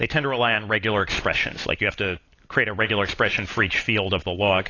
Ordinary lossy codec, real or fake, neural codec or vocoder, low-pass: AAC, 32 kbps; real; none; 7.2 kHz